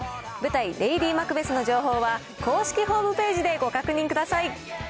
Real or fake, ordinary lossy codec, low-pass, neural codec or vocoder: real; none; none; none